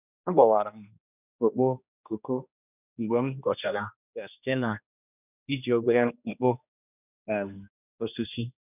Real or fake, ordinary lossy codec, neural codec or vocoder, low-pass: fake; none; codec, 16 kHz, 1 kbps, X-Codec, HuBERT features, trained on general audio; 3.6 kHz